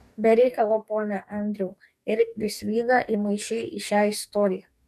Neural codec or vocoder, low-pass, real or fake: codec, 44.1 kHz, 2.6 kbps, DAC; 14.4 kHz; fake